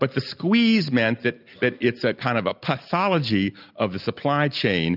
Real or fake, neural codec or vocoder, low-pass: real; none; 5.4 kHz